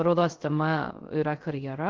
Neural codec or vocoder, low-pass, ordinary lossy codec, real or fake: codec, 16 kHz, 0.3 kbps, FocalCodec; 7.2 kHz; Opus, 16 kbps; fake